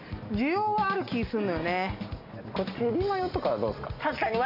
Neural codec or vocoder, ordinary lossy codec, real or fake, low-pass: none; AAC, 48 kbps; real; 5.4 kHz